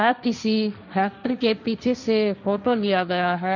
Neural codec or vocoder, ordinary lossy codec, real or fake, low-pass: codec, 16 kHz, 1.1 kbps, Voila-Tokenizer; none; fake; 7.2 kHz